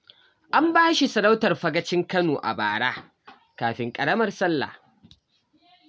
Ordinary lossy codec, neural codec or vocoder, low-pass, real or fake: none; none; none; real